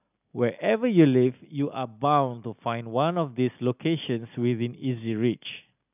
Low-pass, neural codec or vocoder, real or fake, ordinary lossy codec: 3.6 kHz; none; real; none